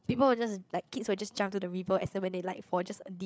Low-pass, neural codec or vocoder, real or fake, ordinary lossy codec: none; codec, 16 kHz, 8 kbps, FreqCodec, larger model; fake; none